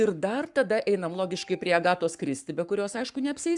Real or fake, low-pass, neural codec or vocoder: fake; 10.8 kHz; codec, 44.1 kHz, 7.8 kbps, Pupu-Codec